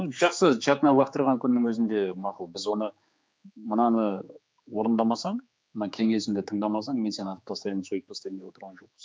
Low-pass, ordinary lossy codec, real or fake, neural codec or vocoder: none; none; fake; codec, 16 kHz, 4 kbps, X-Codec, HuBERT features, trained on general audio